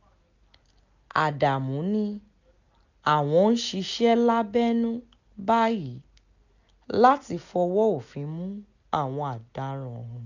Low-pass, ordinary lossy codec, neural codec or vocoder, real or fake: 7.2 kHz; none; none; real